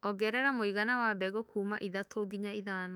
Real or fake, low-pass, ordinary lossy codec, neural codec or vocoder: fake; 19.8 kHz; none; autoencoder, 48 kHz, 32 numbers a frame, DAC-VAE, trained on Japanese speech